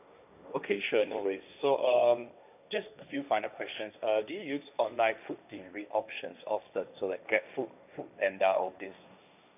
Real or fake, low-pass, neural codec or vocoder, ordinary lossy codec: fake; 3.6 kHz; codec, 16 kHz, 1.1 kbps, Voila-Tokenizer; none